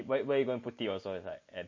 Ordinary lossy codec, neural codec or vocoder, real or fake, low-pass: MP3, 32 kbps; none; real; 7.2 kHz